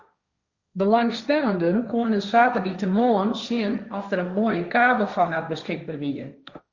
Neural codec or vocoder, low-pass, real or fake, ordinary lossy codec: codec, 16 kHz, 1.1 kbps, Voila-Tokenizer; 7.2 kHz; fake; Opus, 64 kbps